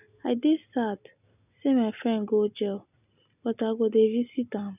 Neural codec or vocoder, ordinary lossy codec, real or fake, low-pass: none; none; real; 3.6 kHz